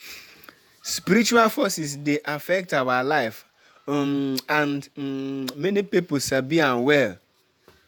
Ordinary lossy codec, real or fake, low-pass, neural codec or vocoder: none; fake; none; vocoder, 48 kHz, 128 mel bands, Vocos